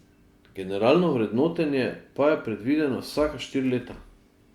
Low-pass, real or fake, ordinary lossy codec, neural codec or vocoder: 19.8 kHz; real; Opus, 64 kbps; none